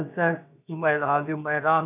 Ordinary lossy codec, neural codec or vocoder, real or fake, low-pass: none; codec, 16 kHz, about 1 kbps, DyCAST, with the encoder's durations; fake; 3.6 kHz